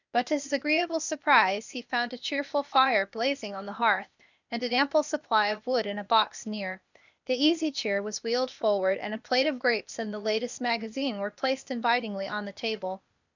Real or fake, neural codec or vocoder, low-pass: fake; codec, 16 kHz, 0.8 kbps, ZipCodec; 7.2 kHz